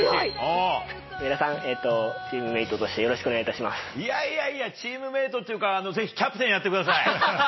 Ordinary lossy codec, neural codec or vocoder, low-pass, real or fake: MP3, 24 kbps; none; 7.2 kHz; real